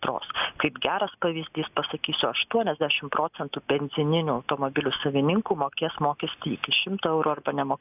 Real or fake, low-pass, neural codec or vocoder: real; 3.6 kHz; none